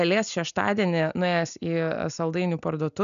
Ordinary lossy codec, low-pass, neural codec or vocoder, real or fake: MP3, 96 kbps; 7.2 kHz; none; real